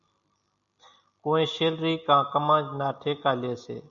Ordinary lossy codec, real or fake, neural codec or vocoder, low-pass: MP3, 96 kbps; real; none; 7.2 kHz